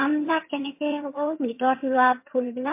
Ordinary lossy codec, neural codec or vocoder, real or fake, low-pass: MP3, 24 kbps; vocoder, 22.05 kHz, 80 mel bands, HiFi-GAN; fake; 3.6 kHz